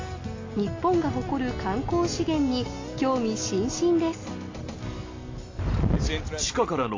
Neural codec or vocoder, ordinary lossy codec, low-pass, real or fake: none; AAC, 32 kbps; 7.2 kHz; real